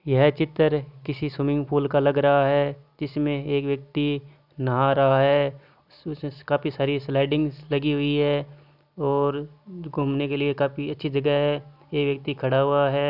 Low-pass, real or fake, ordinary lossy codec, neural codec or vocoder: 5.4 kHz; real; none; none